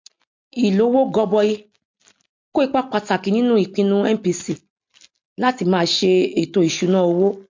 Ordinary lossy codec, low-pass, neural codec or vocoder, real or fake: MP3, 48 kbps; 7.2 kHz; none; real